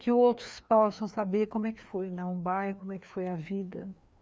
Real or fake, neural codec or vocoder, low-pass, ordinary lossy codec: fake; codec, 16 kHz, 2 kbps, FreqCodec, larger model; none; none